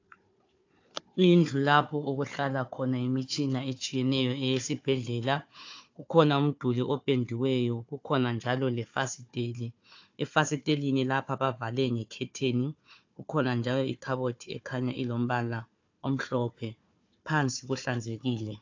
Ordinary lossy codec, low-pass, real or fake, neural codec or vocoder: AAC, 48 kbps; 7.2 kHz; fake; codec, 16 kHz, 4 kbps, FunCodec, trained on Chinese and English, 50 frames a second